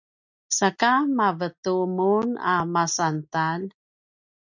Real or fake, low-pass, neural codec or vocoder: real; 7.2 kHz; none